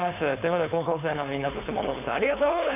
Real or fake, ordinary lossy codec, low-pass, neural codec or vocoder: fake; none; 3.6 kHz; codec, 16 kHz, 4.8 kbps, FACodec